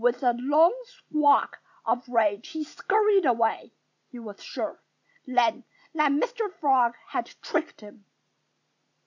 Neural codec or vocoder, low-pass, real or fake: none; 7.2 kHz; real